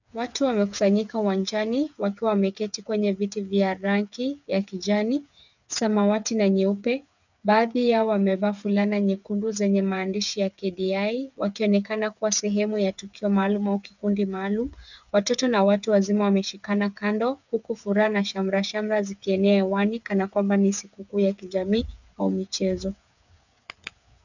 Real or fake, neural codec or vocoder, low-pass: fake; codec, 16 kHz, 8 kbps, FreqCodec, smaller model; 7.2 kHz